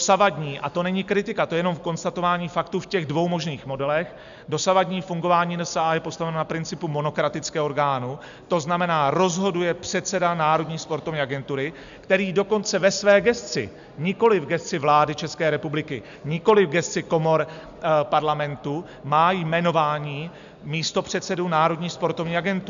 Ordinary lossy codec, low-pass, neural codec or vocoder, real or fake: AAC, 96 kbps; 7.2 kHz; none; real